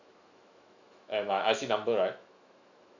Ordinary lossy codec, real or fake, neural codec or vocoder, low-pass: none; fake; autoencoder, 48 kHz, 128 numbers a frame, DAC-VAE, trained on Japanese speech; 7.2 kHz